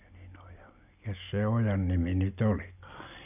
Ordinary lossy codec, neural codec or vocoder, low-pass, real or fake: none; none; 3.6 kHz; real